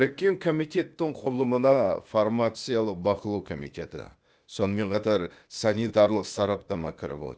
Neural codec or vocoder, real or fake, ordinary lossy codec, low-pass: codec, 16 kHz, 0.8 kbps, ZipCodec; fake; none; none